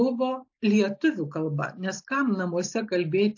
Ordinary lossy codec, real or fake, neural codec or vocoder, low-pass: AAC, 48 kbps; real; none; 7.2 kHz